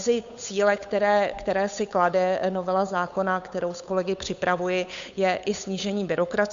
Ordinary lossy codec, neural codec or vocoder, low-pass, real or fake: MP3, 64 kbps; codec, 16 kHz, 8 kbps, FunCodec, trained on Chinese and English, 25 frames a second; 7.2 kHz; fake